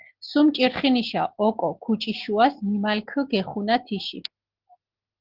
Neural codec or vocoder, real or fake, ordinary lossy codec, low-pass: none; real; Opus, 16 kbps; 5.4 kHz